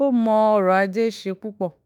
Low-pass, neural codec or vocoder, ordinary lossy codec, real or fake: 19.8 kHz; autoencoder, 48 kHz, 32 numbers a frame, DAC-VAE, trained on Japanese speech; none; fake